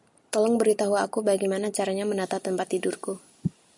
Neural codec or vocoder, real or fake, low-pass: none; real; 10.8 kHz